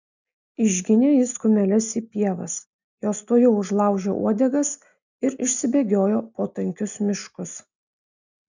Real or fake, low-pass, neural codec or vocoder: real; 7.2 kHz; none